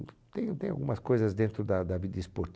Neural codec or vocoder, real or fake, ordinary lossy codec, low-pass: none; real; none; none